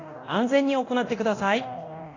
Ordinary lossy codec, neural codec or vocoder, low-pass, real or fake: AAC, 32 kbps; codec, 24 kHz, 0.9 kbps, DualCodec; 7.2 kHz; fake